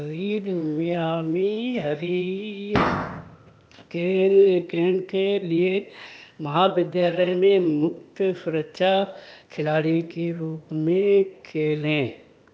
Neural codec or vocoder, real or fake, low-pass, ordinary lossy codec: codec, 16 kHz, 0.8 kbps, ZipCodec; fake; none; none